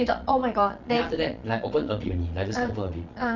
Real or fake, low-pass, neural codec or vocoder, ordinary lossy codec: fake; 7.2 kHz; vocoder, 22.05 kHz, 80 mel bands, Vocos; none